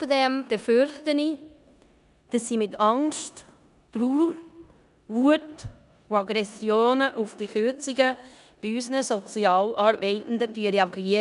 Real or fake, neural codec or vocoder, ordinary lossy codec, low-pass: fake; codec, 16 kHz in and 24 kHz out, 0.9 kbps, LongCat-Audio-Codec, four codebook decoder; none; 10.8 kHz